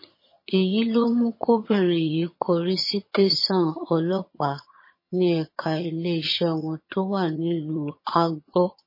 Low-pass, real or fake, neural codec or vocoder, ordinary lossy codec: 5.4 kHz; fake; vocoder, 22.05 kHz, 80 mel bands, HiFi-GAN; MP3, 24 kbps